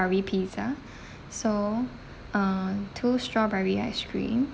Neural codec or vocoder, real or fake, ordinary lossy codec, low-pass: none; real; none; none